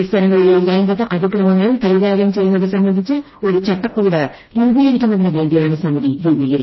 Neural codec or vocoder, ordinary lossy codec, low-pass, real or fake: codec, 16 kHz, 1 kbps, FreqCodec, smaller model; MP3, 24 kbps; 7.2 kHz; fake